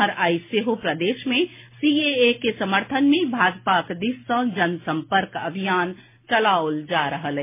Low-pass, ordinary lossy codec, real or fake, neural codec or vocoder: 3.6 kHz; MP3, 24 kbps; real; none